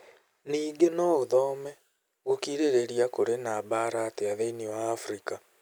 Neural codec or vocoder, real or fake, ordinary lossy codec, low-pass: none; real; none; none